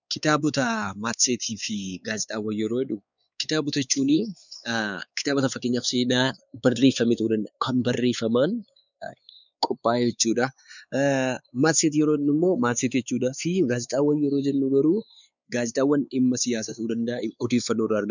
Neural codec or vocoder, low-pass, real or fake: codec, 16 kHz, 4 kbps, X-Codec, WavLM features, trained on Multilingual LibriSpeech; 7.2 kHz; fake